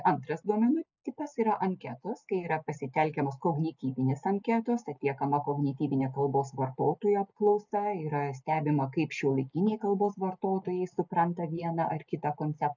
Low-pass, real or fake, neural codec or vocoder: 7.2 kHz; real; none